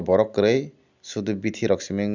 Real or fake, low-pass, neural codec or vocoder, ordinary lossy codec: real; 7.2 kHz; none; none